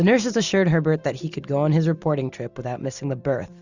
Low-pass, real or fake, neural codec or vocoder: 7.2 kHz; real; none